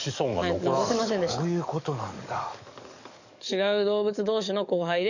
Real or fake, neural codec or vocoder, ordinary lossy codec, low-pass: fake; codec, 44.1 kHz, 7.8 kbps, DAC; none; 7.2 kHz